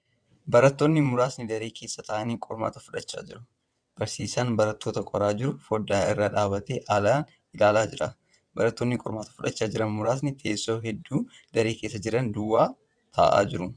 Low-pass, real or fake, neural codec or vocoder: 9.9 kHz; fake; vocoder, 22.05 kHz, 80 mel bands, WaveNeXt